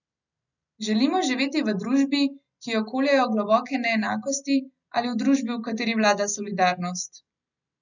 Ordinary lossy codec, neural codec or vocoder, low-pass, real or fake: none; none; 7.2 kHz; real